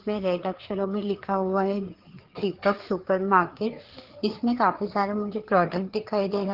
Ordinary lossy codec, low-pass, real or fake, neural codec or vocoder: Opus, 16 kbps; 5.4 kHz; fake; codec, 16 kHz, 4 kbps, FreqCodec, larger model